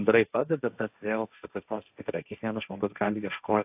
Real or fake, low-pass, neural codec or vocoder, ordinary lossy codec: fake; 3.6 kHz; codec, 16 kHz, 1.1 kbps, Voila-Tokenizer; AAC, 32 kbps